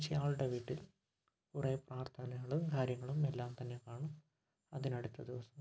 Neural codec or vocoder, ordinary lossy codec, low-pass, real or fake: none; none; none; real